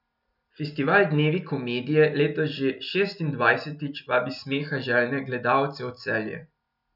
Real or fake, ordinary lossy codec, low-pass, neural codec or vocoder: real; none; 5.4 kHz; none